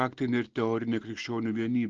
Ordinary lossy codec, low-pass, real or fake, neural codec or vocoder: Opus, 16 kbps; 7.2 kHz; real; none